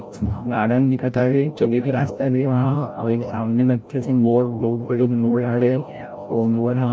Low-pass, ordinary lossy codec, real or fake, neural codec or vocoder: none; none; fake; codec, 16 kHz, 0.5 kbps, FreqCodec, larger model